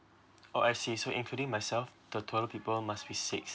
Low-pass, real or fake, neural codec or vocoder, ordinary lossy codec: none; real; none; none